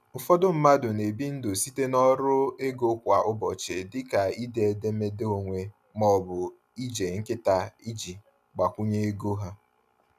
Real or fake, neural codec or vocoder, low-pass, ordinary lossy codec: real; none; 14.4 kHz; none